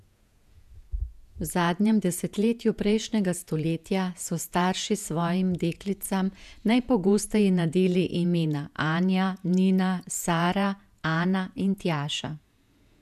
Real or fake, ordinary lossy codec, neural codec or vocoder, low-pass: fake; none; vocoder, 48 kHz, 128 mel bands, Vocos; 14.4 kHz